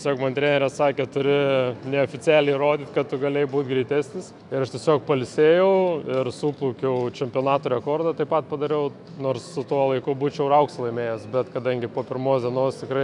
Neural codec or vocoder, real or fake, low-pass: autoencoder, 48 kHz, 128 numbers a frame, DAC-VAE, trained on Japanese speech; fake; 10.8 kHz